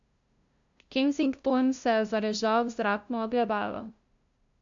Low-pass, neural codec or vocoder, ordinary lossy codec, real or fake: 7.2 kHz; codec, 16 kHz, 0.5 kbps, FunCodec, trained on LibriTTS, 25 frames a second; AAC, 64 kbps; fake